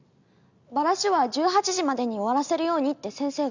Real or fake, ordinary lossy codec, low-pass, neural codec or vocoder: real; none; 7.2 kHz; none